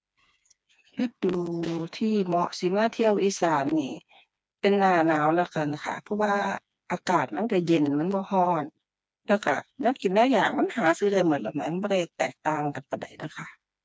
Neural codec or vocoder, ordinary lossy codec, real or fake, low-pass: codec, 16 kHz, 2 kbps, FreqCodec, smaller model; none; fake; none